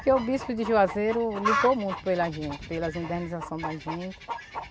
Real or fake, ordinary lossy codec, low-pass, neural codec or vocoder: real; none; none; none